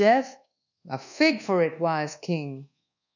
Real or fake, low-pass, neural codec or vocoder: fake; 7.2 kHz; codec, 24 kHz, 1.2 kbps, DualCodec